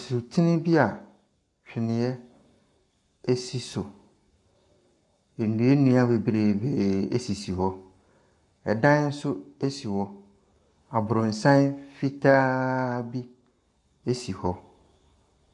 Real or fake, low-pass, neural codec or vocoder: fake; 10.8 kHz; codec, 44.1 kHz, 7.8 kbps, Pupu-Codec